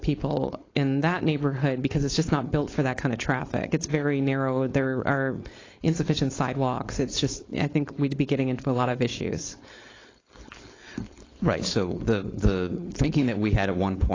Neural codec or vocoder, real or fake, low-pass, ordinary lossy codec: codec, 16 kHz, 4.8 kbps, FACodec; fake; 7.2 kHz; AAC, 32 kbps